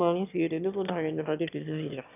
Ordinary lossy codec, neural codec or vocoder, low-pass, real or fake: none; autoencoder, 22.05 kHz, a latent of 192 numbers a frame, VITS, trained on one speaker; 3.6 kHz; fake